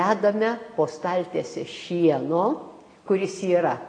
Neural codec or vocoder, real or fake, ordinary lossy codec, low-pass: none; real; AAC, 32 kbps; 9.9 kHz